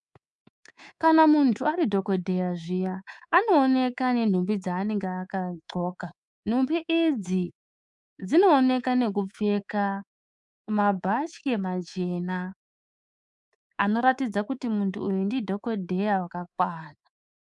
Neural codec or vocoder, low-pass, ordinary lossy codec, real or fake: codec, 24 kHz, 3.1 kbps, DualCodec; 10.8 kHz; MP3, 96 kbps; fake